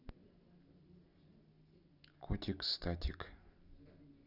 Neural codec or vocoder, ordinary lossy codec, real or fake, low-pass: none; none; real; 5.4 kHz